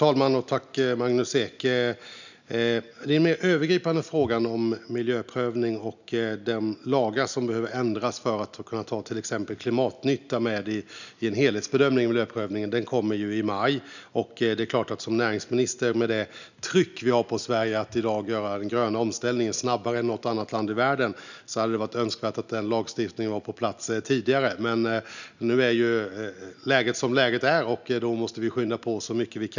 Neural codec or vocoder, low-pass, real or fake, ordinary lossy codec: none; 7.2 kHz; real; none